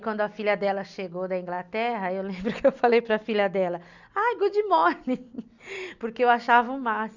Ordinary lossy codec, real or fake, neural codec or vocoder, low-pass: none; real; none; 7.2 kHz